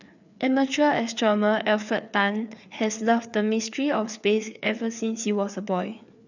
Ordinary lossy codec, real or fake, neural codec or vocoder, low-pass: none; fake; codec, 16 kHz, 4 kbps, FreqCodec, larger model; 7.2 kHz